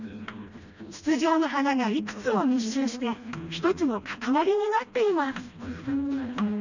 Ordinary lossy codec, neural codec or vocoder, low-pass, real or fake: none; codec, 16 kHz, 1 kbps, FreqCodec, smaller model; 7.2 kHz; fake